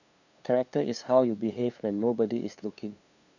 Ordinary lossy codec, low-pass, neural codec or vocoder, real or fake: none; 7.2 kHz; codec, 16 kHz, 2 kbps, FunCodec, trained on LibriTTS, 25 frames a second; fake